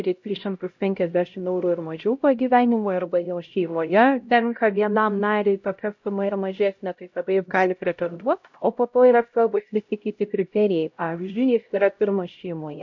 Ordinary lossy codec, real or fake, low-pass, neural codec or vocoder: MP3, 48 kbps; fake; 7.2 kHz; codec, 16 kHz, 0.5 kbps, X-Codec, HuBERT features, trained on LibriSpeech